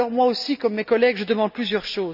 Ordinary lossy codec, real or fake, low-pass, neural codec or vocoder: none; real; 5.4 kHz; none